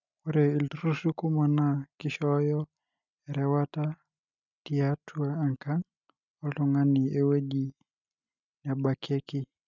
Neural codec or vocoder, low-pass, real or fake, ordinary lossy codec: none; 7.2 kHz; real; none